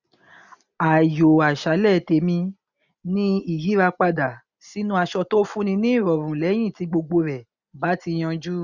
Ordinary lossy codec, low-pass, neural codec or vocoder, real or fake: none; 7.2 kHz; none; real